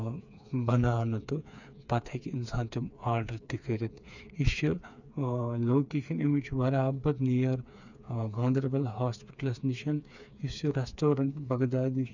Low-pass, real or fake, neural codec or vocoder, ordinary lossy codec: 7.2 kHz; fake; codec, 16 kHz, 4 kbps, FreqCodec, smaller model; none